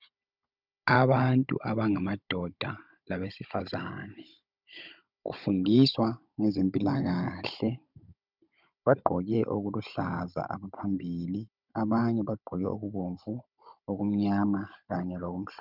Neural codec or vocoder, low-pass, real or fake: codec, 16 kHz, 16 kbps, FunCodec, trained on Chinese and English, 50 frames a second; 5.4 kHz; fake